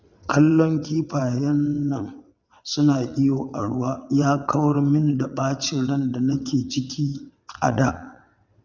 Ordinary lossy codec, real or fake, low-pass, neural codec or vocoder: none; fake; 7.2 kHz; vocoder, 22.05 kHz, 80 mel bands, WaveNeXt